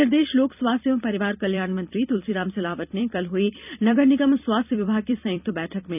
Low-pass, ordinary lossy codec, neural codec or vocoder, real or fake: 3.6 kHz; none; vocoder, 44.1 kHz, 128 mel bands every 256 samples, BigVGAN v2; fake